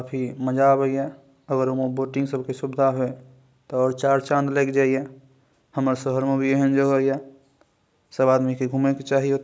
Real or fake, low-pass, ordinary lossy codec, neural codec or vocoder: real; none; none; none